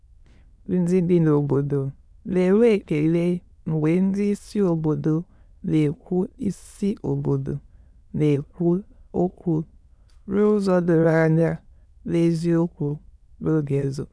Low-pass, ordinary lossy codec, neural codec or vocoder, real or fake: none; none; autoencoder, 22.05 kHz, a latent of 192 numbers a frame, VITS, trained on many speakers; fake